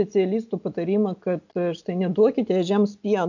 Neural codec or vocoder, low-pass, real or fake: none; 7.2 kHz; real